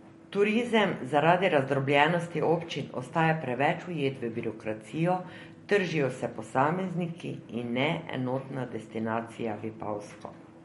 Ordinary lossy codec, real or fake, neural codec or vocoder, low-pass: MP3, 48 kbps; real; none; 19.8 kHz